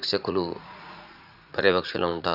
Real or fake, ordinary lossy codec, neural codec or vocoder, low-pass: fake; none; vocoder, 44.1 kHz, 80 mel bands, Vocos; 5.4 kHz